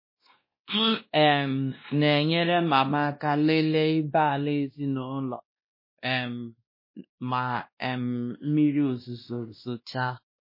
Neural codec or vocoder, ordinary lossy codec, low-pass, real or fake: codec, 16 kHz, 1 kbps, X-Codec, WavLM features, trained on Multilingual LibriSpeech; MP3, 24 kbps; 5.4 kHz; fake